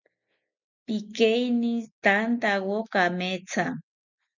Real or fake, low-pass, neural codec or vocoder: real; 7.2 kHz; none